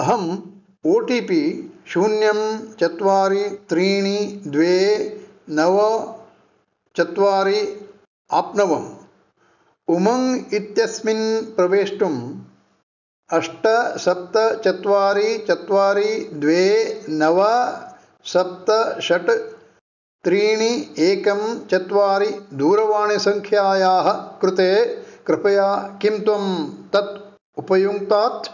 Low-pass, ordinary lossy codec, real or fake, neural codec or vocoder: 7.2 kHz; none; real; none